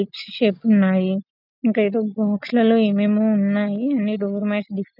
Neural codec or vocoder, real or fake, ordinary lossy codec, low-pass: none; real; none; 5.4 kHz